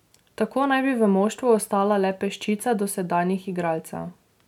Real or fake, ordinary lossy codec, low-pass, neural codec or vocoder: real; none; 19.8 kHz; none